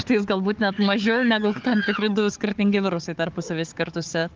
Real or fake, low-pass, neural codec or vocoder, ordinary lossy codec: fake; 7.2 kHz; codec, 16 kHz, 4 kbps, FunCodec, trained on Chinese and English, 50 frames a second; Opus, 24 kbps